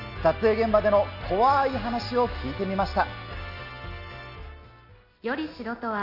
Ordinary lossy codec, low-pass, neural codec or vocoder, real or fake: none; 5.4 kHz; none; real